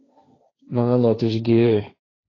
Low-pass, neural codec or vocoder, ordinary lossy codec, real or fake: 7.2 kHz; codec, 16 kHz, 1.1 kbps, Voila-Tokenizer; AAC, 32 kbps; fake